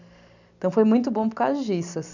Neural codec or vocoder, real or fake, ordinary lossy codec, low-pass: none; real; none; 7.2 kHz